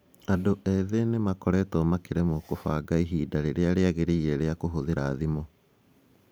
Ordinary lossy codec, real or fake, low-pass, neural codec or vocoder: none; fake; none; vocoder, 44.1 kHz, 128 mel bands every 512 samples, BigVGAN v2